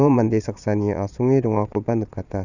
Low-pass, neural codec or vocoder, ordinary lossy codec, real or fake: 7.2 kHz; vocoder, 22.05 kHz, 80 mel bands, Vocos; none; fake